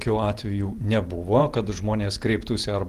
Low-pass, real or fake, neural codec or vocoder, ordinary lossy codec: 14.4 kHz; fake; vocoder, 48 kHz, 128 mel bands, Vocos; Opus, 24 kbps